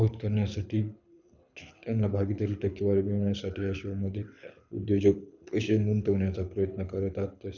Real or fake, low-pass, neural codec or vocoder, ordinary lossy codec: fake; 7.2 kHz; codec, 24 kHz, 6 kbps, HILCodec; none